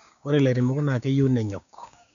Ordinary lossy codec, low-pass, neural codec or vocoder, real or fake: none; 7.2 kHz; codec, 16 kHz, 6 kbps, DAC; fake